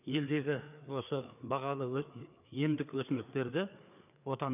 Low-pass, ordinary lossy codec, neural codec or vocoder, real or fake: 3.6 kHz; none; codec, 16 kHz, 4 kbps, FreqCodec, larger model; fake